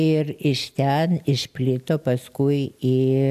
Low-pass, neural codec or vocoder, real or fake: 14.4 kHz; none; real